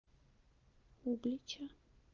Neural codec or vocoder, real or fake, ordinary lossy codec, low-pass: none; real; Opus, 24 kbps; 7.2 kHz